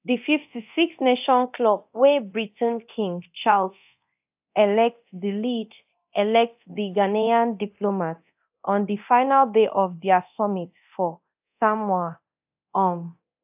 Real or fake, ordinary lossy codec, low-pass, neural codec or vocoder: fake; AAC, 32 kbps; 3.6 kHz; codec, 24 kHz, 0.9 kbps, DualCodec